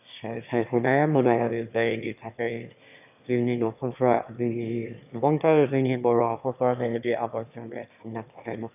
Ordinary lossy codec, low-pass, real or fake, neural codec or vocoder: none; 3.6 kHz; fake; autoencoder, 22.05 kHz, a latent of 192 numbers a frame, VITS, trained on one speaker